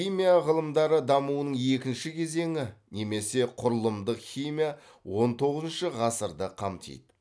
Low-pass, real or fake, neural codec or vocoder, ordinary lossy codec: none; real; none; none